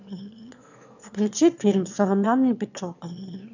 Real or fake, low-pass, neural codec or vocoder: fake; 7.2 kHz; autoencoder, 22.05 kHz, a latent of 192 numbers a frame, VITS, trained on one speaker